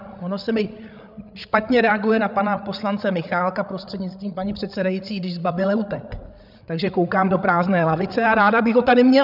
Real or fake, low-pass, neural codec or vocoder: fake; 5.4 kHz; codec, 16 kHz, 8 kbps, FreqCodec, larger model